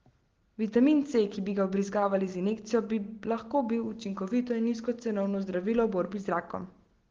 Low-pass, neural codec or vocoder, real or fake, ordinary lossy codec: 7.2 kHz; none; real; Opus, 16 kbps